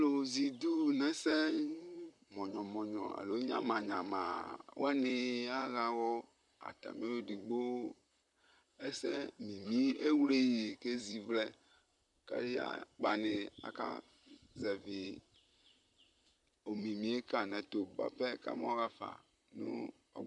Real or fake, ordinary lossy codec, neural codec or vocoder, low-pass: fake; MP3, 96 kbps; vocoder, 44.1 kHz, 128 mel bands, Pupu-Vocoder; 10.8 kHz